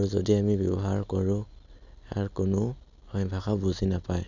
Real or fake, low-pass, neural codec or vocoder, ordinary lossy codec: real; 7.2 kHz; none; none